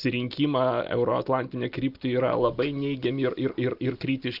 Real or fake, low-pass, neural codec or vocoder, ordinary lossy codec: real; 5.4 kHz; none; Opus, 32 kbps